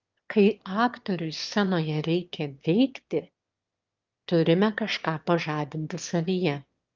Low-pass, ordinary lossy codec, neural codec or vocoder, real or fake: 7.2 kHz; Opus, 24 kbps; autoencoder, 22.05 kHz, a latent of 192 numbers a frame, VITS, trained on one speaker; fake